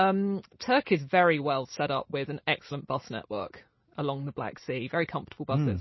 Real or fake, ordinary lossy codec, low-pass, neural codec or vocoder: real; MP3, 24 kbps; 7.2 kHz; none